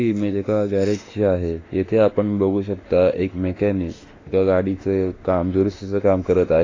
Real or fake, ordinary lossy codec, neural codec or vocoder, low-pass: fake; AAC, 32 kbps; autoencoder, 48 kHz, 32 numbers a frame, DAC-VAE, trained on Japanese speech; 7.2 kHz